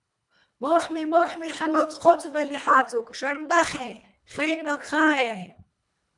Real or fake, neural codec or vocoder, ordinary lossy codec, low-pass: fake; codec, 24 kHz, 1.5 kbps, HILCodec; MP3, 96 kbps; 10.8 kHz